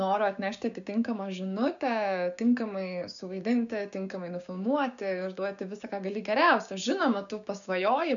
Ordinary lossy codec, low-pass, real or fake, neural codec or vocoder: MP3, 96 kbps; 7.2 kHz; fake; codec, 16 kHz, 6 kbps, DAC